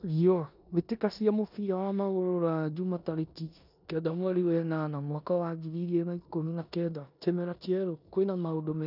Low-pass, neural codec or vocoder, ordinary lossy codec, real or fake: 5.4 kHz; codec, 16 kHz in and 24 kHz out, 0.9 kbps, LongCat-Audio-Codec, four codebook decoder; none; fake